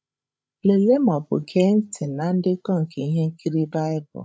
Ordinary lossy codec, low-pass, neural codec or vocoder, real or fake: none; none; codec, 16 kHz, 16 kbps, FreqCodec, larger model; fake